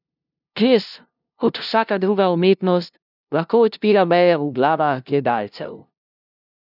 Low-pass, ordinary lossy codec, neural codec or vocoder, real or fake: 5.4 kHz; none; codec, 16 kHz, 0.5 kbps, FunCodec, trained on LibriTTS, 25 frames a second; fake